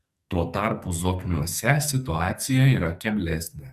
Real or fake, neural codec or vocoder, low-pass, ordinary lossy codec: fake; codec, 44.1 kHz, 2.6 kbps, SNAC; 14.4 kHz; Opus, 64 kbps